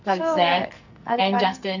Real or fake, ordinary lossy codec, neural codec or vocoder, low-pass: fake; none; codec, 44.1 kHz, 2.6 kbps, SNAC; 7.2 kHz